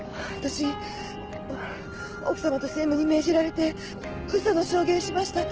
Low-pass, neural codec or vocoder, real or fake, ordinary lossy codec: 7.2 kHz; none; real; Opus, 16 kbps